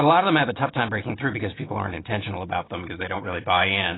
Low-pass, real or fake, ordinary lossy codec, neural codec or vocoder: 7.2 kHz; real; AAC, 16 kbps; none